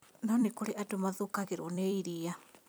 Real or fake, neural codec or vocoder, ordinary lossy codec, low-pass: fake; vocoder, 44.1 kHz, 128 mel bands every 256 samples, BigVGAN v2; none; none